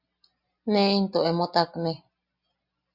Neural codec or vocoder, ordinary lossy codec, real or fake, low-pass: none; Opus, 64 kbps; real; 5.4 kHz